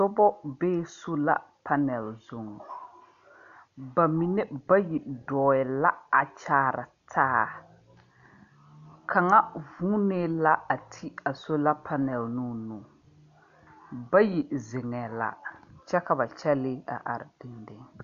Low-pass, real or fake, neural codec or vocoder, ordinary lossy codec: 7.2 kHz; real; none; AAC, 96 kbps